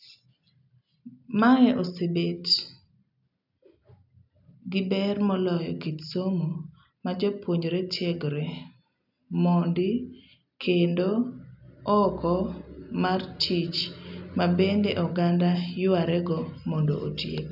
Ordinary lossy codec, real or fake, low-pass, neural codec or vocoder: none; real; 5.4 kHz; none